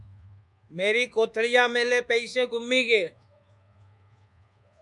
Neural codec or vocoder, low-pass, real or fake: codec, 24 kHz, 1.2 kbps, DualCodec; 10.8 kHz; fake